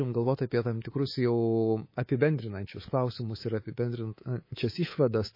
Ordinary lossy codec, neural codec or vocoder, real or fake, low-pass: MP3, 24 kbps; codec, 24 kHz, 3.1 kbps, DualCodec; fake; 5.4 kHz